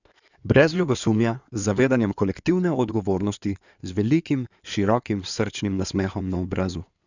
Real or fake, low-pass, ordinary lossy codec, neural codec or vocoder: fake; 7.2 kHz; none; codec, 16 kHz in and 24 kHz out, 2.2 kbps, FireRedTTS-2 codec